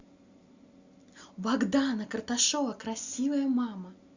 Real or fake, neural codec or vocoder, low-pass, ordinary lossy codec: real; none; 7.2 kHz; Opus, 64 kbps